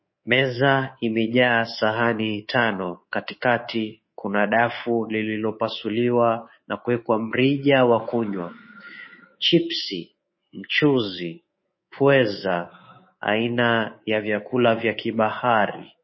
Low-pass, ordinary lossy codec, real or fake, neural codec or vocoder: 7.2 kHz; MP3, 24 kbps; fake; codec, 24 kHz, 3.1 kbps, DualCodec